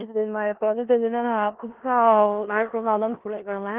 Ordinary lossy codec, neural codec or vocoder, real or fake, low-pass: Opus, 16 kbps; codec, 16 kHz in and 24 kHz out, 0.4 kbps, LongCat-Audio-Codec, four codebook decoder; fake; 3.6 kHz